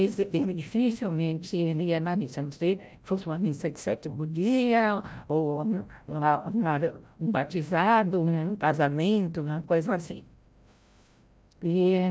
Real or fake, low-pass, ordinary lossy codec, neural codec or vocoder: fake; none; none; codec, 16 kHz, 0.5 kbps, FreqCodec, larger model